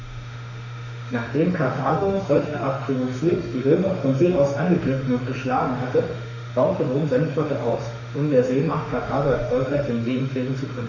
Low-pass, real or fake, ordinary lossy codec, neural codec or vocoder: 7.2 kHz; fake; none; autoencoder, 48 kHz, 32 numbers a frame, DAC-VAE, trained on Japanese speech